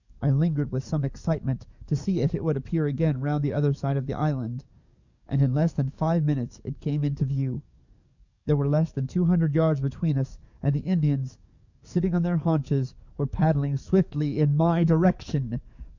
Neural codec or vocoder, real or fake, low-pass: codec, 44.1 kHz, 7.8 kbps, DAC; fake; 7.2 kHz